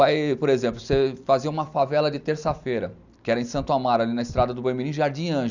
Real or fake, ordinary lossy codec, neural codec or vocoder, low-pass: real; none; none; 7.2 kHz